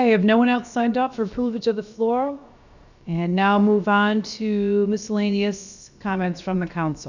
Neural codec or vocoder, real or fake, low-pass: codec, 16 kHz, about 1 kbps, DyCAST, with the encoder's durations; fake; 7.2 kHz